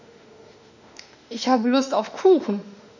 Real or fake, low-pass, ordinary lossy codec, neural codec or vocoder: fake; 7.2 kHz; none; autoencoder, 48 kHz, 32 numbers a frame, DAC-VAE, trained on Japanese speech